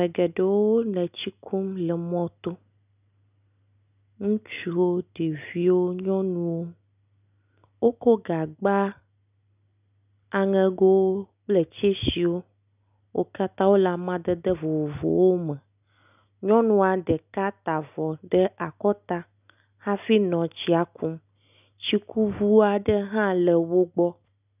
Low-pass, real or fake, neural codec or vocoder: 3.6 kHz; real; none